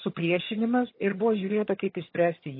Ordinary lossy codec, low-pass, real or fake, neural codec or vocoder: MP3, 24 kbps; 5.4 kHz; fake; vocoder, 22.05 kHz, 80 mel bands, HiFi-GAN